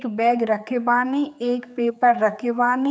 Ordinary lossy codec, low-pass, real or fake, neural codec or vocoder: none; none; fake; codec, 16 kHz, 4 kbps, X-Codec, HuBERT features, trained on general audio